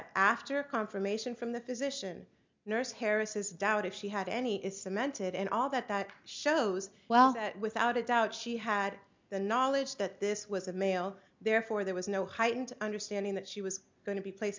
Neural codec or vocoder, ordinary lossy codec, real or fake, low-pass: none; MP3, 64 kbps; real; 7.2 kHz